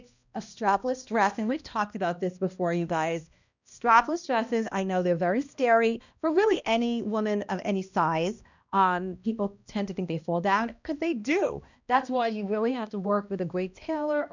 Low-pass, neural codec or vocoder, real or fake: 7.2 kHz; codec, 16 kHz, 1 kbps, X-Codec, HuBERT features, trained on balanced general audio; fake